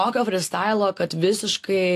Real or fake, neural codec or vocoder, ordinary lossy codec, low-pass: real; none; AAC, 64 kbps; 14.4 kHz